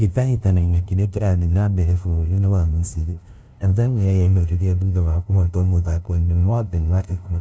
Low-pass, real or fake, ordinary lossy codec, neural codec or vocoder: none; fake; none; codec, 16 kHz, 0.5 kbps, FunCodec, trained on LibriTTS, 25 frames a second